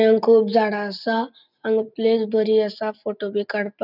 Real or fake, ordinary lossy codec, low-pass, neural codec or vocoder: real; none; 5.4 kHz; none